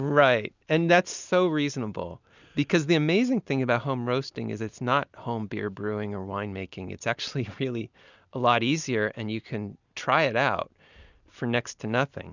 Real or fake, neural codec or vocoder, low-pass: real; none; 7.2 kHz